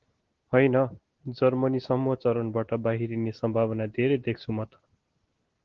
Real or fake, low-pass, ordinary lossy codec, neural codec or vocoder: real; 7.2 kHz; Opus, 16 kbps; none